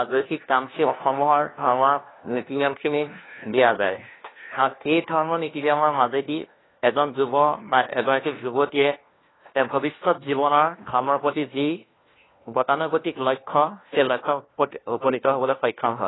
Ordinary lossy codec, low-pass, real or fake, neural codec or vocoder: AAC, 16 kbps; 7.2 kHz; fake; codec, 16 kHz, 1 kbps, FunCodec, trained on LibriTTS, 50 frames a second